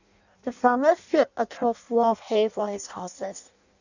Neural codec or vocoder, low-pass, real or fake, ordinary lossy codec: codec, 16 kHz in and 24 kHz out, 0.6 kbps, FireRedTTS-2 codec; 7.2 kHz; fake; none